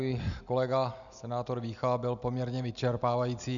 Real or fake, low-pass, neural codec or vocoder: real; 7.2 kHz; none